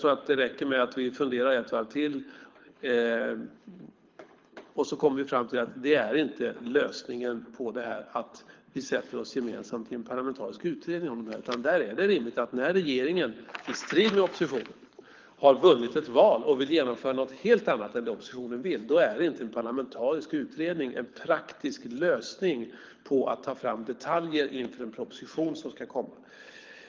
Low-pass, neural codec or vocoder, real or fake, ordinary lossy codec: 7.2 kHz; codec, 24 kHz, 6 kbps, HILCodec; fake; Opus, 32 kbps